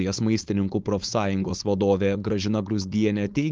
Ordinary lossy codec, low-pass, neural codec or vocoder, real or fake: Opus, 32 kbps; 7.2 kHz; codec, 16 kHz, 4.8 kbps, FACodec; fake